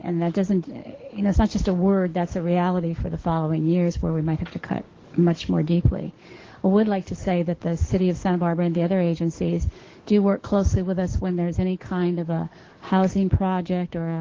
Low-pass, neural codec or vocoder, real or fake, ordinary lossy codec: 7.2 kHz; codec, 44.1 kHz, 7.8 kbps, DAC; fake; Opus, 16 kbps